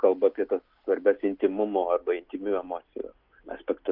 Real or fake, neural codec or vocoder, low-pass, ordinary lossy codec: real; none; 5.4 kHz; Opus, 24 kbps